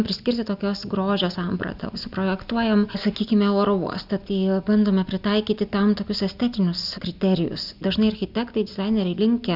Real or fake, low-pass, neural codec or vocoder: real; 5.4 kHz; none